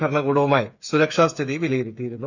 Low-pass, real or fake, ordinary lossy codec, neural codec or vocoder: 7.2 kHz; fake; none; codec, 16 kHz, 8 kbps, FreqCodec, smaller model